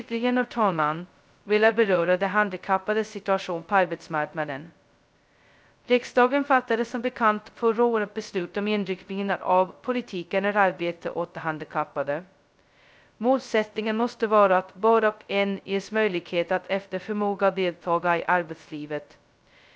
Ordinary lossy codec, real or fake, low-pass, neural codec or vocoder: none; fake; none; codec, 16 kHz, 0.2 kbps, FocalCodec